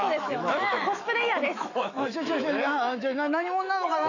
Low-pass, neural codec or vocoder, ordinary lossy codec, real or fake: 7.2 kHz; autoencoder, 48 kHz, 128 numbers a frame, DAC-VAE, trained on Japanese speech; none; fake